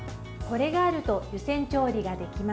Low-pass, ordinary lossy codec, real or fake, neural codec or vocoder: none; none; real; none